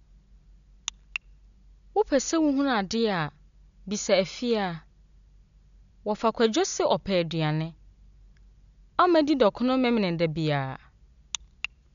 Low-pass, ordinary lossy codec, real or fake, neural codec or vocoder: 7.2 kHz; none; real; none